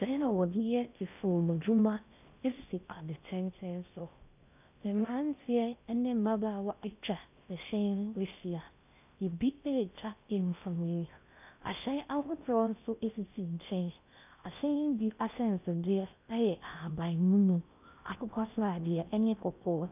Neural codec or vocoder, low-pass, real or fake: codec, 16 kHz in and 24 kHz out, 0.6 kbps, FocalCodec, streaming, 4096 codes; 3.6 kHz; fake